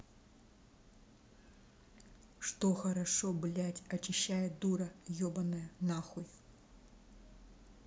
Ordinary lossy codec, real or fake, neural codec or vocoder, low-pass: none; real; none; none